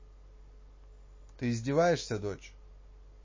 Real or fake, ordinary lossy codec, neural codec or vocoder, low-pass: real; MP3, 32 kbps; none; 7.2 kHz